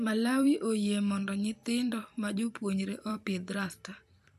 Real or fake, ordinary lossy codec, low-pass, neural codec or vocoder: fake; none; 14.4 kHz; vocoder, 44.1 kHz, 128 mel bands every 256 samples, BigVGAN v2